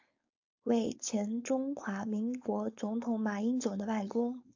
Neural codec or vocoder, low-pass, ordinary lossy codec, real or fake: codec, 16 kHz, 4.8 kbps, FACodec; 7.2 kHz; AAC, 48 kbps; fake